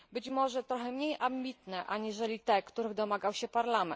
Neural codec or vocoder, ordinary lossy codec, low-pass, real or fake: none; none; none; real